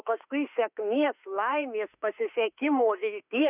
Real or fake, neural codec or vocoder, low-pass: fake; autoencoder, 48 kHz, 32 numbers a frame, DAC-VAE, trained on Japanese speech; 3.6 kHz